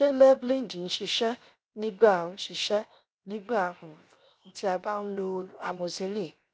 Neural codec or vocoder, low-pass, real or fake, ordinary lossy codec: codec, 16 kHz, 0.7 kbps, FocalCodec; none; fake; none